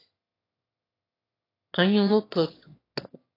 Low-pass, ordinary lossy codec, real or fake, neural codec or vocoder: 5.4 kHz; AAC, 24 kbps; fake; autoencoder, 22.05 kHz, a latent of 192 numbers a frame, VITS, trained on one speaker